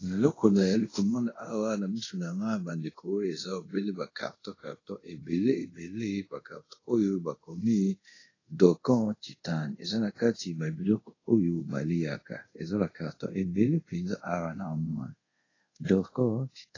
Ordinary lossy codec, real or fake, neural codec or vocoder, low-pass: AAC, 32 kbps; fake; codec, 24 kHz, 0.5 kbps, DualCodec; 7.2 kHz